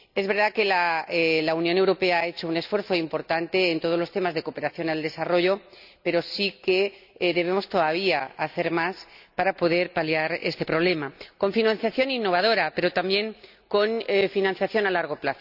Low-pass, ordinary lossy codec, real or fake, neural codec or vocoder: 5.4 kHz; none; real; none